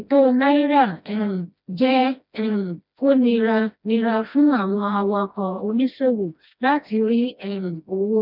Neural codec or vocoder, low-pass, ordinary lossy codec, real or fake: codec, 16 kHz, 1 kbps, FreqCodec, smaller model; 5.4 kHz; none; fake